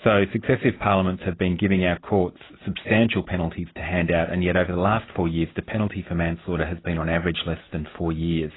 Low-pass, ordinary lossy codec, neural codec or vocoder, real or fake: 7.2 kHz; AAC, 16 kbps; none; real